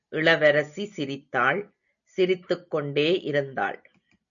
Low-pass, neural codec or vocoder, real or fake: 7.2 kHz; none; real